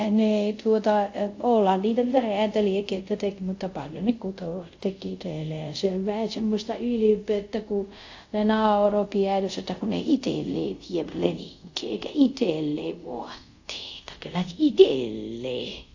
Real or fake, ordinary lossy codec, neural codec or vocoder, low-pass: fake; AAC, 48 kbps; codec, 24 kHz, 0.5 kbps, DualCodec; 7.2 kHz